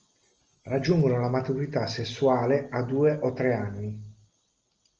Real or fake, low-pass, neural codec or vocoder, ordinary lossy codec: real; 7.2 kHz; none; Opus, 24 kbps